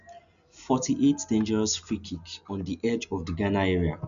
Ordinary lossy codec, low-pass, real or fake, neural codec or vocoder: none; 7.2 kHz; real; none